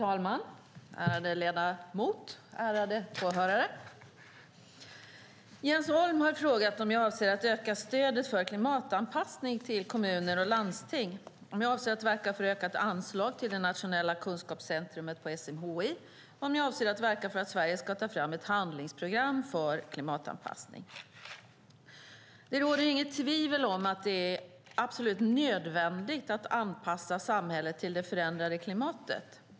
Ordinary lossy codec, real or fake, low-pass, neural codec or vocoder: none; real; none; none